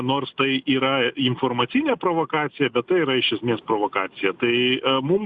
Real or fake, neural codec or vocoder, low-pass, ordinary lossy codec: real; none; 10.8 kHz; Opus, 64 kbps